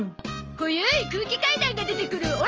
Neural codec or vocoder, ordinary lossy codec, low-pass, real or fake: none; Opus, 24 kbps; 7.2 kHz; real